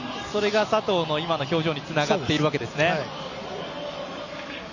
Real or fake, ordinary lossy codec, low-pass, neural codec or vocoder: real; none; 7.2 kHz; none